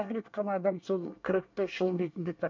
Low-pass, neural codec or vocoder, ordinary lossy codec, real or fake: 7.2 kHz; codec, 24 kHz, 1 kbps, SNAC; MP3, 48 kbps; fake